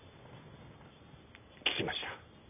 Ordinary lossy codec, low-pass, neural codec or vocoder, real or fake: none; 3.6 kHz; none; real